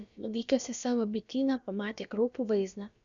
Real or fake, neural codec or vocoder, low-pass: fake; codec, 16 kHz, about 1 kbps, DyCAST, with the encoder's durations; 7.2 kHz